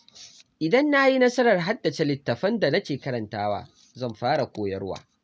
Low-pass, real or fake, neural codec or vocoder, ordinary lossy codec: none; real; none; none